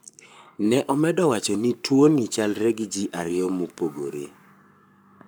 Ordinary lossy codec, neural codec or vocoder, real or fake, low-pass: none; codec, 44.1 kHz, 7.8 kbps, Pupu-Codec; fake; none